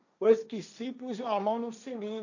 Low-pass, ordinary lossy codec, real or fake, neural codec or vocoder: 7.2 kHz; none; fake; codec, 16 kHz, 1.1 kbps, Voila-Tokenizer